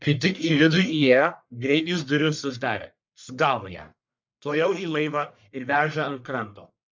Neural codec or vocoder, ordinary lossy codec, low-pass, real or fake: codec, 44.1 kHz, 1.7 kbps, Pupu-Codec; MP3, 64 kbps; 7.2 kHz; fake